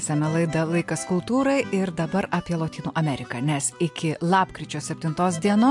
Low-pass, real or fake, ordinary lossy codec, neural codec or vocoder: 10.8 kHz; real; MP3, 64 kbps; none